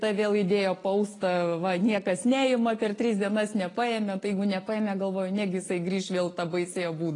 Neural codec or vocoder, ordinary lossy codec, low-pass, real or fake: none; AAC, 32 kbps; 10.8 kHz; real